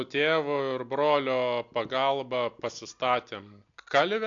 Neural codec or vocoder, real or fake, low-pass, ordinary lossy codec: none; real; 7.2 kHz; AAC, 64 kbps